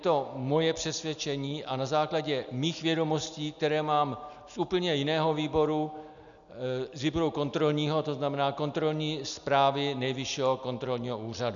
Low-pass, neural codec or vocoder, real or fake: 7.2 kHz; none; real